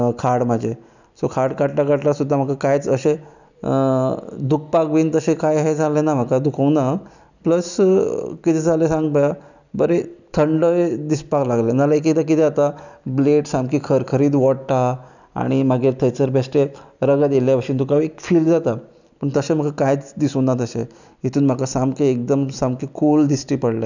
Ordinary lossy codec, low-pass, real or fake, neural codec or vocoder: none; 7.2 kHz; real; none